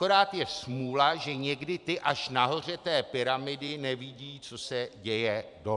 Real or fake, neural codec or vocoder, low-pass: fake; vocoder, 44.1 kHz, 128 mel bands every 512 samples, BigVGAN v2; 10.8 kHz